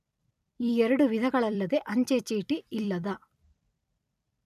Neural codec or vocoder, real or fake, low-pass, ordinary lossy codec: vocoder, 44.1 kHz, 128 mel bands every 256 samples, BigVGAN v2; fake; 14.4 kHz; none